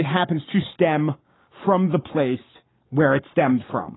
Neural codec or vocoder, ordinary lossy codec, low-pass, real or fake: codec, 44.1 kHz, 7.8 kbps, Pupu-Codec; AAC, 16 kbps; 7.2 kHz; fake